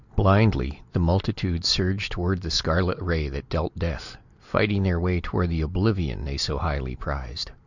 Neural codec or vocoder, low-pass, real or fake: none; 7.2 kHz; real